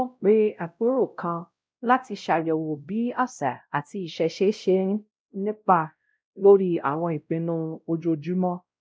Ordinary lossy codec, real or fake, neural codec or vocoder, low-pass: none; fake; codec, 16 kHz, 0.5 kbps, X-Codec, WavLM features, trained on Multilingual LibriSpeech; none